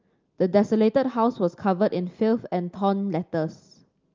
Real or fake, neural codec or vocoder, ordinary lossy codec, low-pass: real; none; Opus, 24 kbps; 7.2 kHz